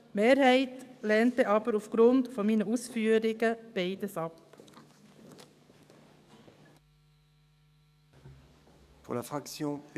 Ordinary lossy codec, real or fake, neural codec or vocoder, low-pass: none; fake; codec, 44.1 kHz, 7.8 kbps, DAC; 14.4 kHz